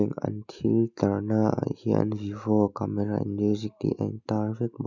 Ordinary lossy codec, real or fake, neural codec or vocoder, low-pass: none; real; none; 7.2 kHz